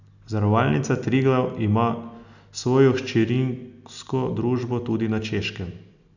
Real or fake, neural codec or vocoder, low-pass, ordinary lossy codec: real; none; 7.2 kHz; none